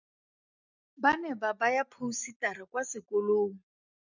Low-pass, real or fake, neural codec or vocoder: 7.2 kHz; real; none